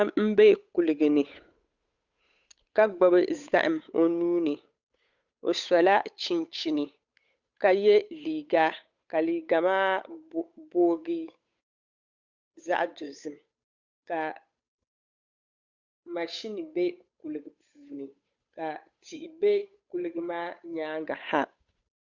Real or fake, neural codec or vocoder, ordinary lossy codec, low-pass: fake; codec, 16 kHz, 8 kbps, FunCodec, trained on Chinese and English, 25 frames a second; Opus, 64 kbps; 7.2 kHz